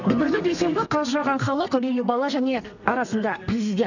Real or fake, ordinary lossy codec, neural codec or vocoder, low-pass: fake; none; codec, 32 kHz, 1.9 kbps, SNAC; 7.2 kHz